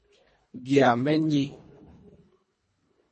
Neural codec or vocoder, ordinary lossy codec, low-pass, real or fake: codec, 24 kHz, 1.5 kbps, HILCodec; MP3, 32 kbps; 10.8 kHz; fake